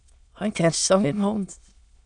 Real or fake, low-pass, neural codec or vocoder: fake; 9.9 kHz; autoencoder, 22.05 kHz, a latent of 192 numbers a frame, VITS, trained on many speakers